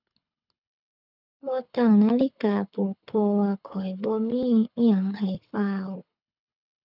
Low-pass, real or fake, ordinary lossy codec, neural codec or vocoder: 5.4 kHz; fake; none; codec, 24 kHz, 6 kbps, HILCodec